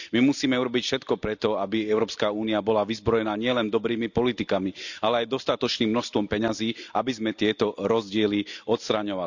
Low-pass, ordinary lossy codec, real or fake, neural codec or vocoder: 7.2 kHz; none; real; none